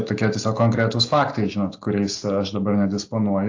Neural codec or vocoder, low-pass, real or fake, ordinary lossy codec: none; 7.2 kHz; real; AAC, 48 kbps